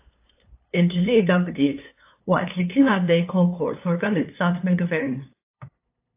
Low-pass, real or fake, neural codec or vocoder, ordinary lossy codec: 3.6 kHz; fake; codec, 16 kHz, 2 kbps, FunCodec, trained on LibriTTS, 25 frames a second; AAC, 24 kbps